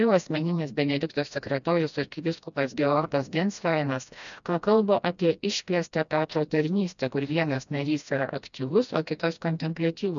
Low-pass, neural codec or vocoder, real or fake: 7.2 kHz; codec, 16 kHz, 1 kbps, FreqCodec, smaller model; fake